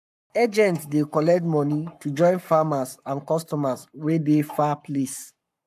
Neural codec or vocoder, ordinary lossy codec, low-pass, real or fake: codec, 44.1 kHz, 7.8 kbps, Pupu-Codec; AAC, 96 kbps; 14.4 kHz; fake